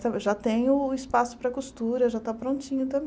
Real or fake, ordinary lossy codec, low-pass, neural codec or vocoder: real; none; none; none